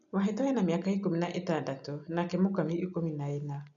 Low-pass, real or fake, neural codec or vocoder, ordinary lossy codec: 7.2 kHz; real; none; none